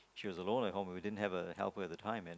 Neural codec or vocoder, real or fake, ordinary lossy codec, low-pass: none; real; none; none